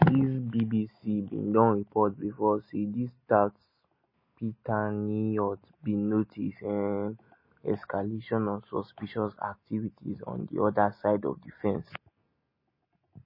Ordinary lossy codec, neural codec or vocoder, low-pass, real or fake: MP3, 24 kbps; none; 5.4 kHz; real